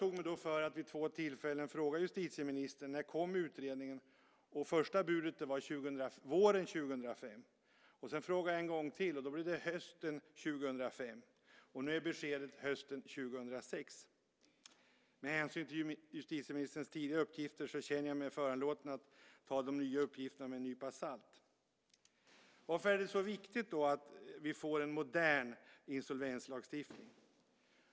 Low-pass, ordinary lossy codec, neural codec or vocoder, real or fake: none; none; none; real